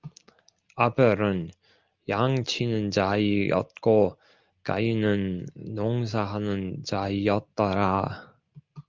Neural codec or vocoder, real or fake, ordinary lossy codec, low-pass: none; real; Opus, 32 kbps; 7.2 kHz